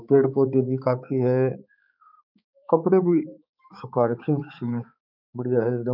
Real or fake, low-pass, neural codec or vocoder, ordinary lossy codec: fake; 5.4 kHz; codec, 16 kHz, 4 kbps, X-Codec, HuBERT features, trained on balanced general audio; none